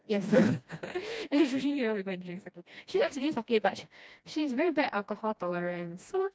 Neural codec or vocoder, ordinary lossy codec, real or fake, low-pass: codec, 16 kHz, 1 kbps, FreqCodec, smaller model; none; fake; none